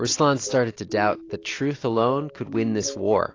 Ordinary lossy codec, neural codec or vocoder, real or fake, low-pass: AAC, 32 kbps; none; real; 7.2 kHz